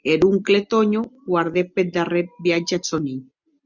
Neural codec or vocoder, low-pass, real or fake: none; 7.2 kHz; real